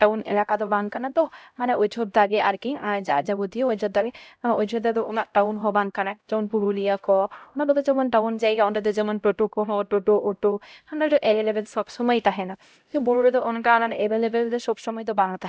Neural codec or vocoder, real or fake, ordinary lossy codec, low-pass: codec, 16 kHz, 0.5 kbps, X-Codec, HuBERT features, trained on LibriSpeech; fake; none; none